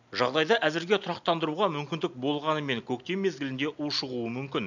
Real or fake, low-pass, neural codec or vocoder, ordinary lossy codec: real; 7.2 kHz; none; none